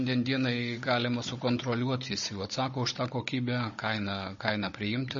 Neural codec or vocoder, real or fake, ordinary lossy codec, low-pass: codec, 16 kHz, 16 kbps, FunCodec, trained on Chinese and English, 50 frames a second; fake; MP3, 32 kbps; 7.2 kHz